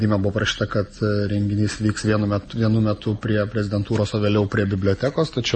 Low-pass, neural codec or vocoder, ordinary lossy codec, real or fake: 9.9 kHz; none; MP3, 32 kbps; real